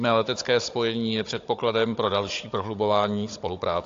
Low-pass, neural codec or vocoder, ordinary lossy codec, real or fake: 7.2 kHz; codec, 16 kHz, 16 kbps, FunCodec, trained on Chinese and English, 50 frames a second; AAC, 48 kbps; fake